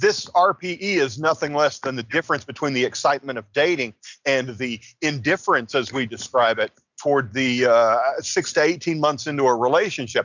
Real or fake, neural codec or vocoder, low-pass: real; none; 7.2 kHz